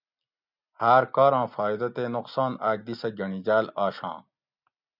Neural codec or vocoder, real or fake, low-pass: none; real; 5.4 kHz